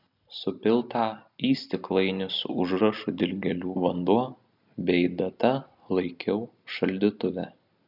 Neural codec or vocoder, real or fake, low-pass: none; real; 5.4 kHz